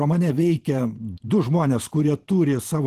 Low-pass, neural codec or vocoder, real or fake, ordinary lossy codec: 14.4 kHz; vocoder, 44.1 kHz, 128 mel bands every 512 samples, BigVGAN v2; fake; Opus, 16 kbps